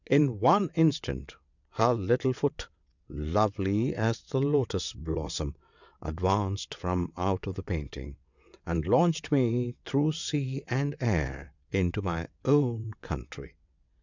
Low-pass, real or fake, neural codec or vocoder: 7.2 kHz; fake; vocoder, 22.05 kHz, 80 mel bands, WaveNeXt